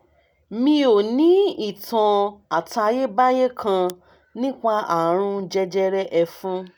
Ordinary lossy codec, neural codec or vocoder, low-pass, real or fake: none; none; none; real